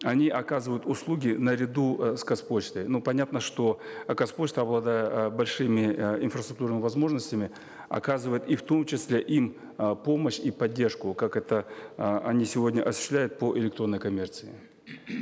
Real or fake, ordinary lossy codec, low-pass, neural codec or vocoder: real; none; none; none